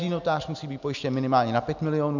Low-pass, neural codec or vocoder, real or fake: 7.2 kHz; none; real